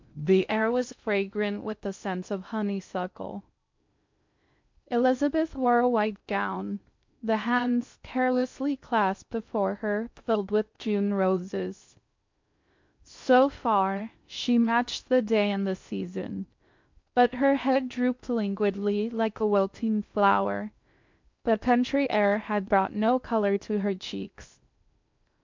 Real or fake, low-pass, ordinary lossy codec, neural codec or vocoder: fake; 7.2 kHz; MP3, 64 kbps; codec, 16 kHz in and 24 kHz out, 0.6 kbps, FocalCodec, streaming, 2048 codes